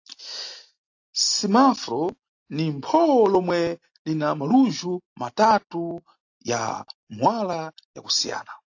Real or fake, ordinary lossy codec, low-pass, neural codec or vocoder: real; AAC, 48 kbps; 7.2 kHz; none